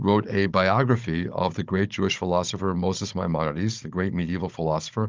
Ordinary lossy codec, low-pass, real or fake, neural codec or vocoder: Opus, 24 kbps; 7.2 kHz; fake; codec, 16 kHz, 16 kbps, FunCodec, trained on Chinese and English, 50 frames a second